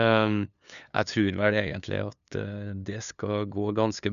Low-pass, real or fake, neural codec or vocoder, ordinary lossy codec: 7.2 kHz; fake; codec, 16 kHz, 2 kbps, FunCodec, trained on LibriTTS, 25 frames a second; none